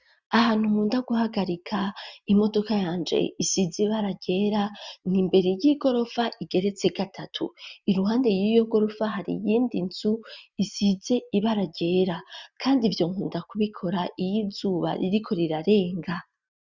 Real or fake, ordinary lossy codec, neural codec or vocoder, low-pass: real; Opus, 64 kbps; none; 7.2 kHz